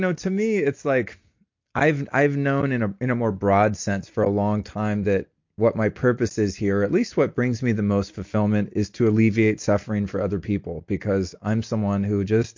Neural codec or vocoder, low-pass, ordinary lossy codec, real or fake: none; 7.2 kHz; MP3, 48 kbps; real